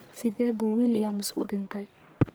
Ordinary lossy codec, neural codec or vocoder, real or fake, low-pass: none; codec, 44.1 kHz, 1.7 kbps, Pupu-Codec; fake; none